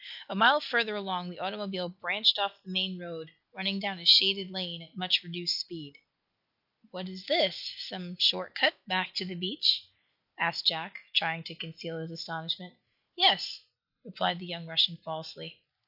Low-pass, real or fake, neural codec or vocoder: 5.4 kHz; real; none